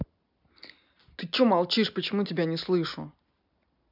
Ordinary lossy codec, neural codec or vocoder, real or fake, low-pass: none; none; real; 5.4 kHz